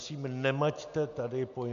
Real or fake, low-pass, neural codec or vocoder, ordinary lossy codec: real; 7.2 kHz; none; AAC, 64 kbps